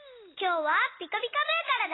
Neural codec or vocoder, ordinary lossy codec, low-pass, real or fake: none; AAC, 16 kbps; 7.2 kHz; real